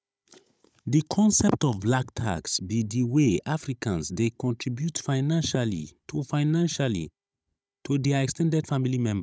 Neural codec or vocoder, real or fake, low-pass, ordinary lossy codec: codec, 16 kHz, 16 kbps, FunCodec, trained on Chinese and English, 50 frames a second; fake; none; none